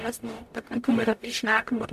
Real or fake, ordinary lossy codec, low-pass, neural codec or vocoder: fake; AAC, 96 kbps; 14.4 kHz; codec, 44.1 kHz, 0.9 kbps, DAC